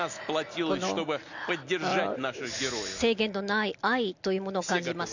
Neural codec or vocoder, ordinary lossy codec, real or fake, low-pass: none; none; real; 7.2 kHz